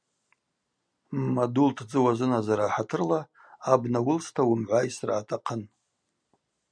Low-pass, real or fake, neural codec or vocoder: 9.9 kHz; real; none